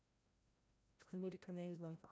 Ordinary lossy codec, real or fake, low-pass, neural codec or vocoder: none; fake; none; codec, 16 kHz, 0.5 kbps, FreqCodec, larger model